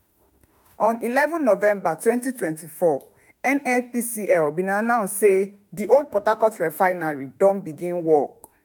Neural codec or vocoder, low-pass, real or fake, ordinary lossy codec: autoencoder, 48 kHz, 32 numbers a frame, DAC-VAE, trained on Japanese speech; none; fake; none